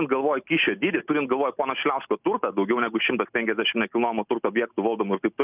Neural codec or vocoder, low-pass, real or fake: none; 3.6 kHz; real